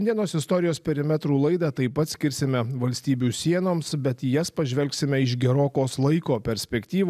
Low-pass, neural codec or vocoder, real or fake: 14.4 kHz; none; real